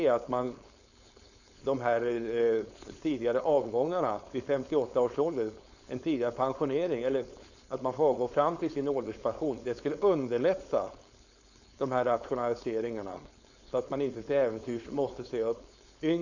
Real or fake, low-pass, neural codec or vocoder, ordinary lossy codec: fake; 7.2 kHz; codec, 16 kHz, 4.8 kbps, FACodec; none